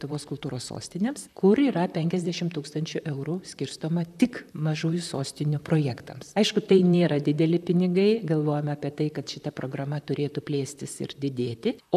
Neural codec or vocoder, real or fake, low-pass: vocoder, 44.1 kHz, 128 mel bands, Pupu-Vocoder; fake; 14.4 kHz